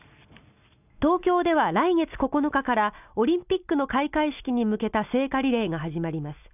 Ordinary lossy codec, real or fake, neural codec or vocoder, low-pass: none; real; none; 3.6 kHz